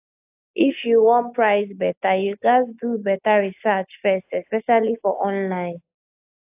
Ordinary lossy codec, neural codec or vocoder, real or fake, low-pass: AAC, 32 kbps; none; real; 3.6 kHz